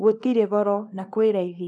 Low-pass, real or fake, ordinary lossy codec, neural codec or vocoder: none; fake; none; codec, 24 kHz, 0.9 kbps, WavTokenizer, small release